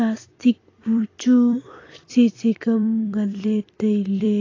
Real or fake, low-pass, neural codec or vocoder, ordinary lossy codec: fake; 7.2 kHz; vocoder, 44.1 kHz, 128 mel bands every 256 samples, BigVGAN v2; AAC, 32 kbps